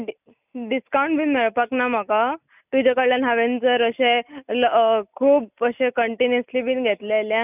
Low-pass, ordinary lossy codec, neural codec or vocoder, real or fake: 3.6 kHz; none; none; real